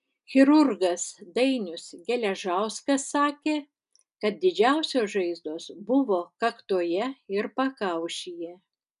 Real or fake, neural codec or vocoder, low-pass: real; none; 10.8 kHz